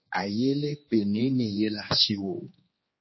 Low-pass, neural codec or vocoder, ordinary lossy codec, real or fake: 7.2 kHz; codec, 16 kHz, 2 kbps, X-Codec, HuBERT features, trained on general audio; MP3, 24 kbps; fake